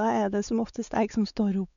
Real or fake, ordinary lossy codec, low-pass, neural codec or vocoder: fake; none; 7.2 kHz; codec, 16 kHz, 4 kbps, X-Codec, WavLM features, trained on Multilingual LibriSpeech